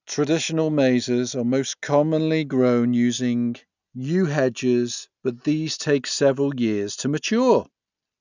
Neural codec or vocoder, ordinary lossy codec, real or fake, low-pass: none; none; real; 7.2 kHz